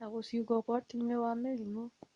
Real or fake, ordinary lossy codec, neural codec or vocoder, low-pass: fake; Opus, 64 kbps; codec, 24 kHz, 0.9 kbps, WavTokenizer, medium speech release version 2; 10.8 kHz